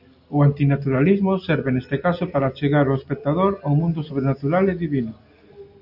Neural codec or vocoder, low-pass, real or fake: none; 5.4 kHz; real